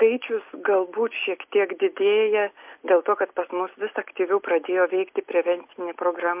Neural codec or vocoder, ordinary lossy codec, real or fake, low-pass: none; MP3, 32 kbps; real; 3.6 kHz